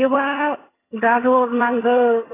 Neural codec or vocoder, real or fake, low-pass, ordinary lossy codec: vocoder, 22.05 kHz, 80 mel bands, HiFi-GAN; fake; 3.6 kHz; AAC, 16 kbps